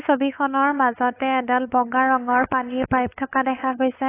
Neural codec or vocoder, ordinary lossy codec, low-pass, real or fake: codec, 16 kHz, 8 kbps, FunCodec, trained on LibriTTS, 25 frames a second; AAC, 16 kbps; 3.6 kHz; fake